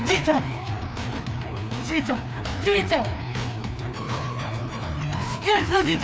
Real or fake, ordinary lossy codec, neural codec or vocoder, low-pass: fake; none; codec, 16 kHz, 2 kbps, FreqCodec, larger model; none